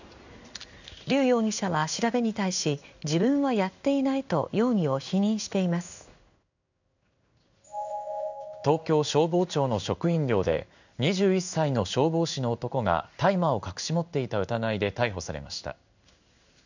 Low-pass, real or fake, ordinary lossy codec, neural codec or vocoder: 7.2 kHz; fake; none; codec, 16 kHz in and 24 kHz out, 1 kbps, XY-Tokenizer